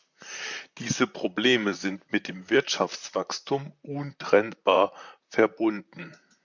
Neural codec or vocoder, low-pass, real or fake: vocoder, 44.1 kHz, 128 mel bands, Pupu-Vocoder; 7.2 kHz; fake